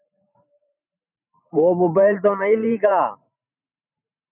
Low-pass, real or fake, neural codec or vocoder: 3.6 kHz; fake; vocoder, 44.1 kHz, 128 mel bands every 512 samples, BigVGAN v2